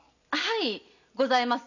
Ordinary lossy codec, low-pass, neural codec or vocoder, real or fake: none; 7.2 kHz; none; real